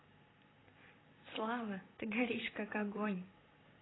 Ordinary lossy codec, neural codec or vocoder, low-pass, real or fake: AAC, 16 kbps; vocoder, 44.1 kHz, 128 mel bands, Pupu-Vocoder; 7.2 kHz; fake